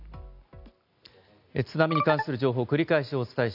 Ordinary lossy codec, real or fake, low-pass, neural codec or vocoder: none; real; 5.4 kHz; none